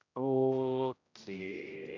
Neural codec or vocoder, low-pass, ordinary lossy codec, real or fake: codec, 16 kHz, 0.5 kbps, X-Codec, HuBERT features, trained on general audio; 7.2 kHz; MP3, 64 kbps; fake